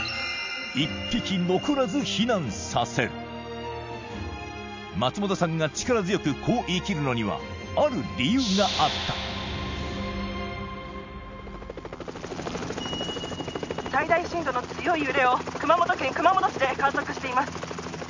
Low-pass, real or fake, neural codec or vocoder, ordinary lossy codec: 7.2 kHz; real; none; MP3, 64 kbps